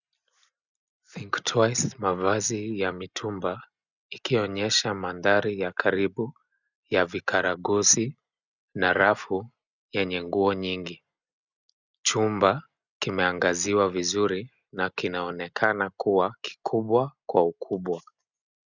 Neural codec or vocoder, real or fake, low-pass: none; real; 7.2 kHz